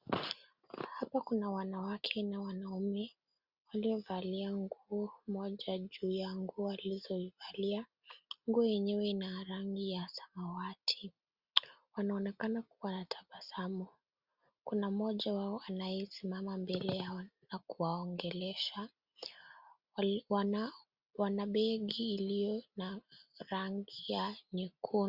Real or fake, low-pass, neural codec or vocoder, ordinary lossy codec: real; 5.4 kHz; none; Opus, 64 kbps